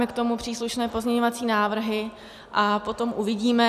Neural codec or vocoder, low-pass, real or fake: none; 14.4 kHz; real